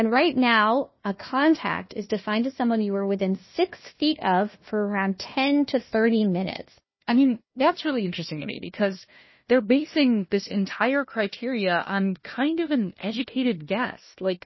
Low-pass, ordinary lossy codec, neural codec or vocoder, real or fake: 7.2 kHz; MP3, 24 kbps; codec, 16 kHz, 1 kbps, FunCodec, trained on Chinese and English, 50 frames a second; fake